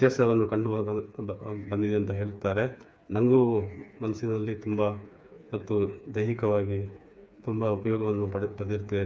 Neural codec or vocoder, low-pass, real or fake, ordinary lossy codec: codec, 16 kHz, 4 kbps, FreqCodec, smaller model; none; fake; none